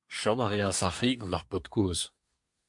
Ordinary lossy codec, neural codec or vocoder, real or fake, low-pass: MP3, 64 kbps; codec, 24 kHz, 1 kbps, SNAC; fake; 10.8 kHz